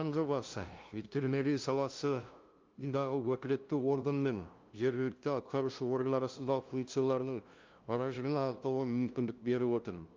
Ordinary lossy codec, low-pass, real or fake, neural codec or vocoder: Opus, 32 kbps; 7.2 kHz; fake; codec, 16 kHz, 0.5 kbps, FunCodec, trained on LibriTTS, 25 frames a second